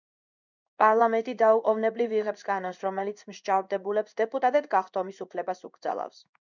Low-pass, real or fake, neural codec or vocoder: 7.2 kHz; fake; codec, 16 kHz in and 24 kHz out, 1 kbps, XY-Tokenizer